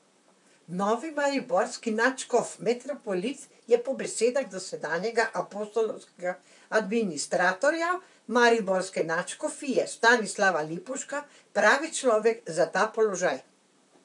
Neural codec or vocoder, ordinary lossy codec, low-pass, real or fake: vocoder, 44.1 kHz, 128 mel bands, Pupu-Vocoder; MP3, 96 kbps; 10.8 kHz; fake